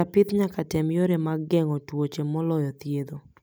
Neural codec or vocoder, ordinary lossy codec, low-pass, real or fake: none; none; none; real